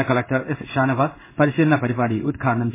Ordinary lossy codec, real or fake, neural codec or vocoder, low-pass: MP3, 16 kbps; fake; codec, 16 kHz in and 24 kHz out, 1 kbps, XY-Tokenizer; 3.6 kHz